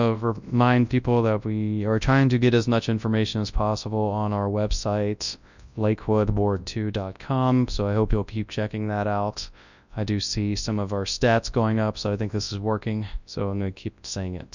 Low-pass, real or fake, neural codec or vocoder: 7.2 kHz; fake; codec, 24 kHz, 0.9 kbps, WavTokenizer, large speech release